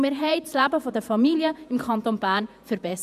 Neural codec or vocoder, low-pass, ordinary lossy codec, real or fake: vocoder, 48 kHz, 128 mel bands, Vocos; 14.4 kHz; none; fake